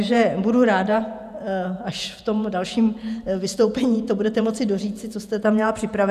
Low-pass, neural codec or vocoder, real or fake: 14.4 kHz; vocoder, 48 kHz, 128 mel bands, Vocos; fake